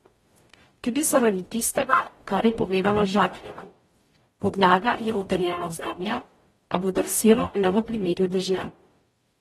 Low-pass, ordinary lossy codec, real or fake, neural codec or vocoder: 19.8 kHz; AAC, 32 kbps; fake; codec, 44.1 kHz, 0.9 kbps, DAC